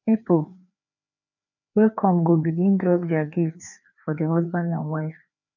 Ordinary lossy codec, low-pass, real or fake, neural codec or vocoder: none; 7.2 kHz; fake; codec, 16 kHz, 2 kbps, FreqCodec, larger model